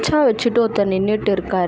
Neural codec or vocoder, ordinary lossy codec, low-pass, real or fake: none; none; none; real